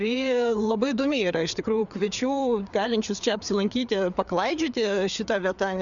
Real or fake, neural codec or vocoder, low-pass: fake; codec, 16 kHz, 4 kbps, FreqCodec, larger model; 7.2 kHz